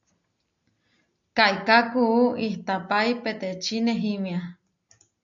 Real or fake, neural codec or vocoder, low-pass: real; none; 7.2 kHz